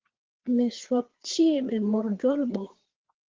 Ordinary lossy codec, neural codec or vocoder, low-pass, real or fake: Opus, 16 kbps; codec, 16 kHz, 4 kbps, FreqCodec, larger model; 7.2 kHz; fake